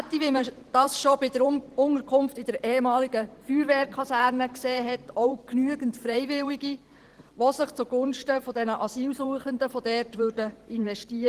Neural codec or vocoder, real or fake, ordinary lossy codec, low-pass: vocoder, 44.1 kHz, 128 mel bands every 512 samples, BigVGAN v2; fake; Opus, 16 kbps; 14.4 kHz